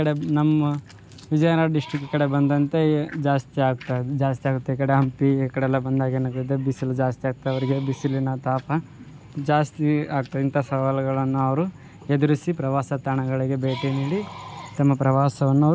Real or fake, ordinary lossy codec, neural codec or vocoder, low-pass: real; none; none; none